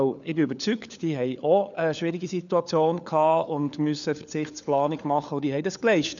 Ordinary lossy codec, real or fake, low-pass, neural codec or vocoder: none; fake; 7.2 kHz; codec, 16 kHz, 4 kbps, FunCodec, trained on LibriTTS, 50 frames a second